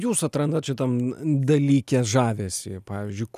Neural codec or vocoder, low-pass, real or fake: none; 14.4 kHz; real